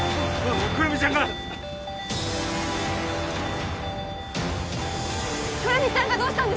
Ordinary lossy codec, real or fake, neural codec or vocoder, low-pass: none; real; none; none